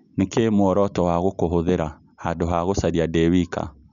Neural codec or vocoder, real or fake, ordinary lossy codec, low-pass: none; real; none; 7.2 kHz